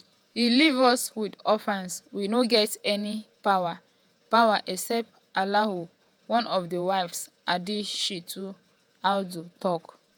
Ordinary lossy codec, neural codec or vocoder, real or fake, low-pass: none; vocoder, 48 kHz, 128 mel bands, Vocos; fake; none